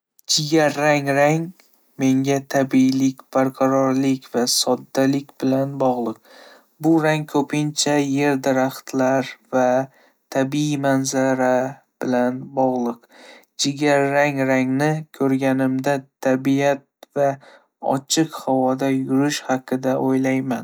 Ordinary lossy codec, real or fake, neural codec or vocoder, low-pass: none; real; none; none